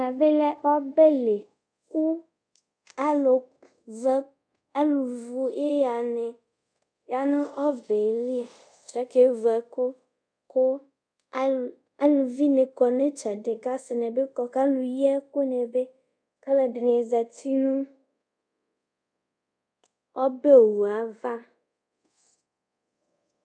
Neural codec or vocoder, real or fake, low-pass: codec, 24 kHz, 0.5 kbps, DualCodec; fake; 9.9 kHz